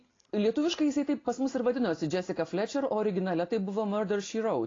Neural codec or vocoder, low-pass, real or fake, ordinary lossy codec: none; 7.2 kHz; real; AAC, 32 kbps